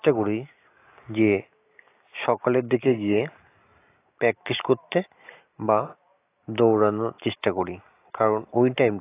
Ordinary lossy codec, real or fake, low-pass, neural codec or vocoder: AAC, 24 kbps; real; 3.6 kHz; none